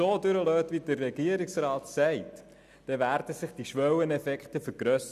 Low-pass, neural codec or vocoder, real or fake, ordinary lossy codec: 14.4 kHz; none; real; none